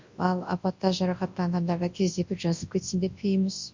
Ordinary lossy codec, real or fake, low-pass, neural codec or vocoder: MP3, 48 kbps; fake; 7.2 kHz; codec, 24 kHz, 0.9 kbps, WavTokenizer, large speech release